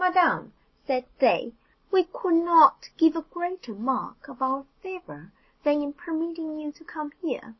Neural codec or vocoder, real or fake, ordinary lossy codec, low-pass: none; real; MP3, 24 kbps; 7.2 kHz